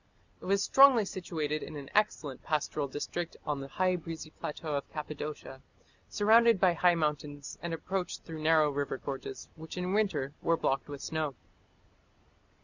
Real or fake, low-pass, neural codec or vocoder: real; 7.2 kHz; none